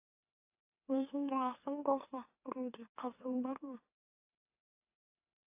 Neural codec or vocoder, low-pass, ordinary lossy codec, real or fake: autoencoder, 44.1 kHz, a latent of 192 numbers a frame, MeloTTS; 3.6 kHz; AAC, 24 kbps; fake